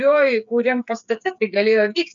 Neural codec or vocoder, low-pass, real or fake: codec, 16 kHz, 8 kbps, FreqCodec, smaller model; 7.2 kHz; fake